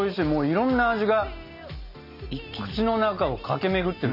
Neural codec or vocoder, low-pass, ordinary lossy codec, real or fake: none; 5.4 kHz; none; real